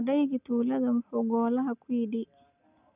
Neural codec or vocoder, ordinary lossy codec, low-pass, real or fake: none; none; 3.6 kHz; real